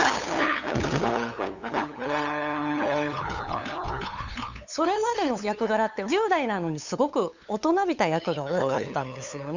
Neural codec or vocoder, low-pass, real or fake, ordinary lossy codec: codec, 16 kHz, 8 kbps, FunCodec, trained on LibriTTS, 25 frames a second; 7.2 kHz; fake; none